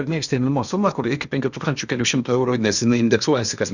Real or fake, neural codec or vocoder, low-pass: fake; codec, 16 kHz in and 24 kHz out, 0.8 kbps, FocalCodec, streaming, 65536 codes; 7.2 kHz